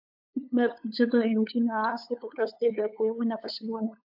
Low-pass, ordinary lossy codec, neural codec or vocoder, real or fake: 5.4 kHz; AAC, 48 kbps; codec, 16 kHz, 8 kbps, FunCodec, trained on LibriTTS, 25 frames a second; fake